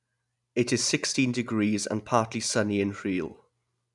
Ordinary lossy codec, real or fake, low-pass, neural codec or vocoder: none; real; 10.8 kHz; none